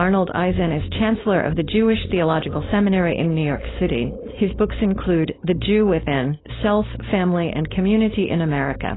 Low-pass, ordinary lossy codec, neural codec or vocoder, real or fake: 7.2 kHz; AAC, 16 kbps; codec, 16 kHz, 4.8 kbps, FACodec; fake